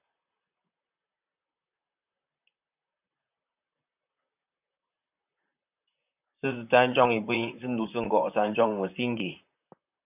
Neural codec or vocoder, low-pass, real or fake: vocoder, 44.1 kHz, 128 mel bands, Pupu-Vocoder; 3.6 kHz; fake